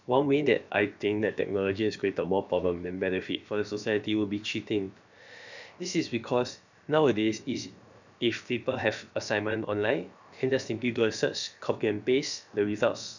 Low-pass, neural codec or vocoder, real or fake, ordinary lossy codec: 7.2 kHz; codec, 16 kHz, about 1 kbps, DyCAST, with the encoder's durations; fake; none